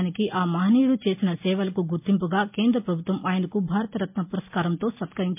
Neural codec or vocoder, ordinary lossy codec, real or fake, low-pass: none; MP3, 32 kbps; real; 3.6 kHz